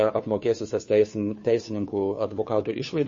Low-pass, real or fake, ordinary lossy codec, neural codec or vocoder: 7.2 kHz; fake; MP3, 32 kbps; codec, 16 kHz, 2 kbps, FunCodec, trained on Chinese and English, 25 frames a second